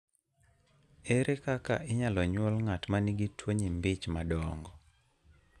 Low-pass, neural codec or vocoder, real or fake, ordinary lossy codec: none; none; real; none